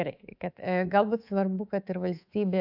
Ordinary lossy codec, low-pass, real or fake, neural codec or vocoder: AAC, 48 kbps; 5.4 kHz; fake; codec, 24 kHz, 3.1 kbps, DualCodec